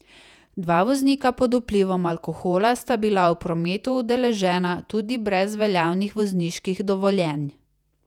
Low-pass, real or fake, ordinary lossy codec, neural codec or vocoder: 19.8 kHz; fake; none; vocoder, 48 kHz, 128 mel bands, Vocos